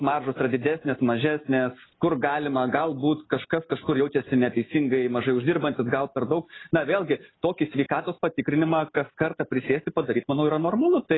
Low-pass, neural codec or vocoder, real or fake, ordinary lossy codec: 7.2 kHz; none; real; AAC, 16 kbps